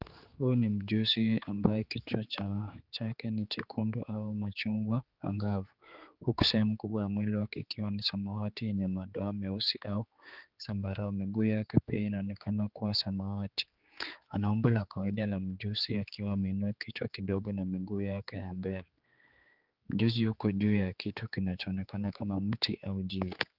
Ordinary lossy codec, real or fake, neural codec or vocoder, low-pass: Opus, 32 kbps; fake; codec, 16 kHz, 4 kbps, X-Codec, HuBERT features, trained on general audio; 5.4 kHz